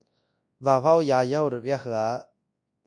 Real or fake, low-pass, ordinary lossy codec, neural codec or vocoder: fake; 9.9 kHz; MP3, 48 kbps; codec, 24 kHz, 0.9 kbps, WavTokenizer, large speech release